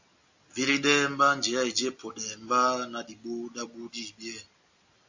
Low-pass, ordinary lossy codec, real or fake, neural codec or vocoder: 7.2 kHz; AAC, 48 kbps; real; none